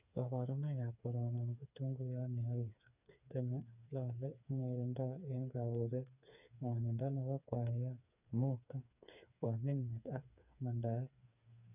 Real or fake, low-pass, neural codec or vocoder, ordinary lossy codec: fake; 3.6 kHz; codec, 16 kHz, 4 kbps, FreqCodec, smaller model; none